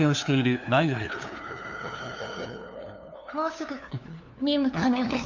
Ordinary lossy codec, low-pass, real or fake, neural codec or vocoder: none; 7.2 kHz; fake; codec, 16 kHz, 2 kbps, FunCodec, trained on LibriTTS, 25 frames a second